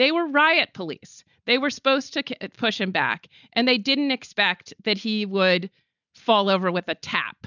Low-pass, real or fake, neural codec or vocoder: 7.2 kHz; real; none